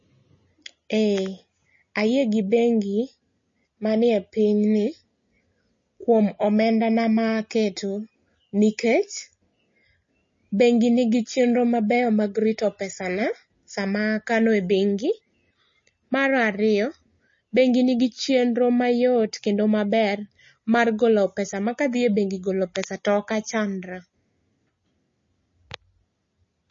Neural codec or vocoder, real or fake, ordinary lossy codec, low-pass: none; real; MP3, 32 kbps; 7.2 kHz